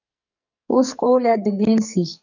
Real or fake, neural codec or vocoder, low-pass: fake; codec, 44.1 kHz, 2.6 kbps, SNAC; 7.2 kHz